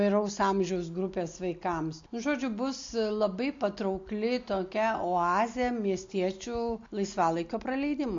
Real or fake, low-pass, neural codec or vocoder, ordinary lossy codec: real; 7.2 kHz; none; MP3, 48 kbps